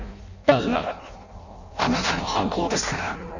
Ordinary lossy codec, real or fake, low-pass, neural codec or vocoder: none; fake; 7.2 kHz; codec, 16 kHz in and 24 kHz out, 0.6 kbps, FireRedTTS-2 codec